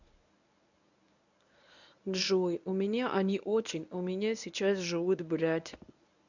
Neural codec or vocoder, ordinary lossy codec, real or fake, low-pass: codec, 24 kHz, 0.9 kbps, WavTokenizer, medium speech release version 1; none; fake; 7.2 kHz